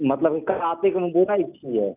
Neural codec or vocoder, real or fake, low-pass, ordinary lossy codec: none; real; 3.6 kHz; none